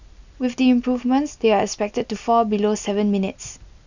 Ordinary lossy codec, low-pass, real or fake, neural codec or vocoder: none; 7.2 kHz; real; none